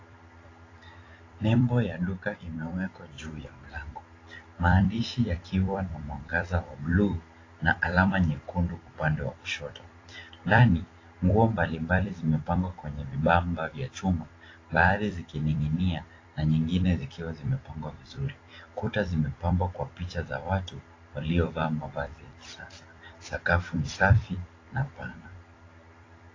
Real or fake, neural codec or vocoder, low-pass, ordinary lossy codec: fake; vocoder, 44.1 kHz, 128 mel bands every 256 samples, BigVGAN v2; 7.2 kHz; AAC, 32 kbps